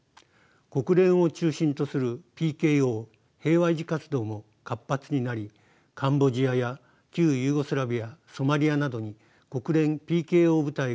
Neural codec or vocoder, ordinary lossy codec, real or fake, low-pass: none; none; real; none